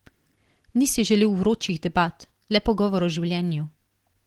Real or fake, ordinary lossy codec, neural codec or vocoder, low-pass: real; Opus, 16 kbps; none; 19.8 kHz